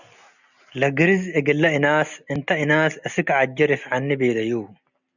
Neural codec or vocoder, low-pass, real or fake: none; 7.2 kHz; real